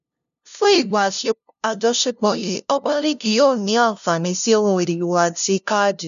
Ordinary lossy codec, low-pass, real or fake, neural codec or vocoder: none; 7.2 kHz; fake; codec, 16 kHz, 0.5 kbps, FunCodec, trained on LibriTTS, 25 frames a second